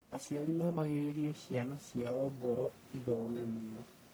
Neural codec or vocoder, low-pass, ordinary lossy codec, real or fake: codec, 44.1 kHz, 1.7 kbps, Pupu-Codec; none; none; fake